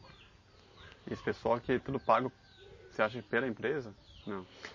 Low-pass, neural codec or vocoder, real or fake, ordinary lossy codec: 7.2 kHz; none; real; MP3, 32 kbps